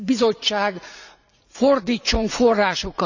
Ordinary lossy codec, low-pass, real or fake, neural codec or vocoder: none; 7.2 kHz; fake; vocoder, 44.1 kHz, 80 mel bands, Vocos